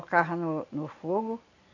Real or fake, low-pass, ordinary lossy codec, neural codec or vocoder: real; 7.2 kHz; AAC, 48 kbps; none